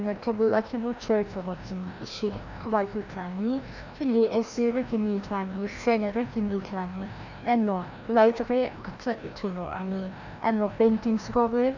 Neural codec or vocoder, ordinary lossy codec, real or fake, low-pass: codec, 16 kHz, 1 kbps, FreqCodec, larger model; none; fake; 7.2 kHz